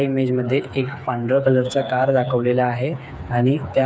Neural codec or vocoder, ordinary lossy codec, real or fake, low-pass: codec, 16 kHz, 4 kbps, FreqCodec, smaller model; none; fake; none